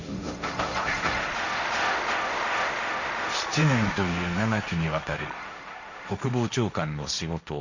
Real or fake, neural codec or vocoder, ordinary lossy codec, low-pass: fake; codec, 16 kHz, 1.1 kbps, Voila-Tokenizer; none; 7.2 kHz